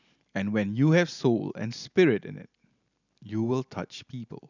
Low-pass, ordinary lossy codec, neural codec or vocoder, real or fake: 7.2 kHz; none; none; real